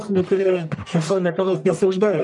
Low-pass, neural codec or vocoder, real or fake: 10.8 kHz; codec, 44.1 kHz, 1.7 kbps, Pupu-Codec; fake